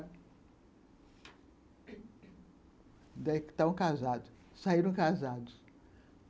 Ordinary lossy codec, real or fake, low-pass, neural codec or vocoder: none; real; none; none